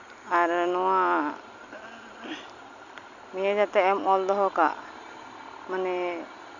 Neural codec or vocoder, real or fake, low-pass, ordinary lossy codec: none; real; 7.2 kHz; none